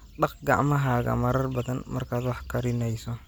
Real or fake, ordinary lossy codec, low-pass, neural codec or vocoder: real; none; none; none